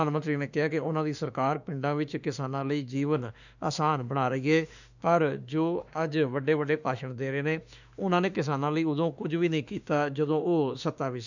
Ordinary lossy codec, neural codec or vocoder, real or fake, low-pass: none; autoencoder, 48 kHz, 32 numbers a frame, DAC-VAE, trained on Japanese speech; fake; 7.2 kHz